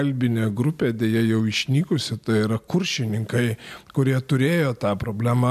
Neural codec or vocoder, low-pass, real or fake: none; 14.4 kHz; real